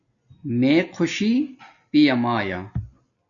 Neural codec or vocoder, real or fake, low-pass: none; real; 7.2 kHz